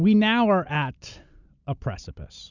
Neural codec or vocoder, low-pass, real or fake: none; 7.2 kHz; real